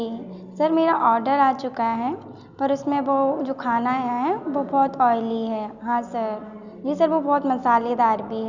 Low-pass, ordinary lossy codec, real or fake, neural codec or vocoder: 7.2 kHz; none; real; none